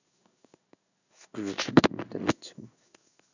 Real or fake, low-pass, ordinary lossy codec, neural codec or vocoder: fake; 7.2 kHz; none; codec, 16 kHz in and 24 kHz out, 1 kbps, XY-Tokenizer